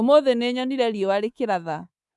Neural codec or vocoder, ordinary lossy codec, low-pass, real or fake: codec, 24 kHz, 3.1 kbps, DualCodec; none; none; fake